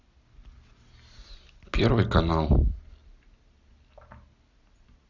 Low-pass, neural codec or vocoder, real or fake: 7.2 kHz; none; real